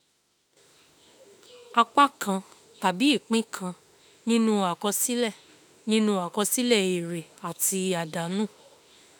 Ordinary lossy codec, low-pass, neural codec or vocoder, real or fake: none; none; autoencoder, 48 kHz, 32 numbers a frame, DAC-VAE, trained on Japanese speech; fake